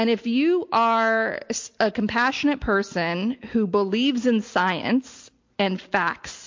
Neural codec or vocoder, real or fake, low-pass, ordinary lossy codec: none; real; 7.2 kHz; MP3, 48 kbps